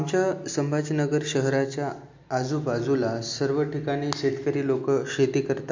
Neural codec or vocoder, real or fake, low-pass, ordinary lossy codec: none; real; 7.2 kHz; MP3, 48 kbps